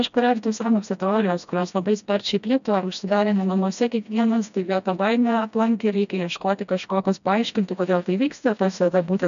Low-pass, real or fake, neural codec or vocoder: 7.2 kHz; fake; codec, 16 kHz, 1 kbps, FreqCodec, smaller model